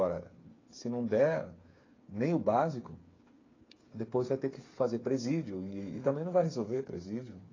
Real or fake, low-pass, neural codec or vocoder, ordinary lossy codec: fake; 7.2 kHz; codec, 16 kHz, 8 kbps, FreqCodec, smaller model; AAC, 32 kbps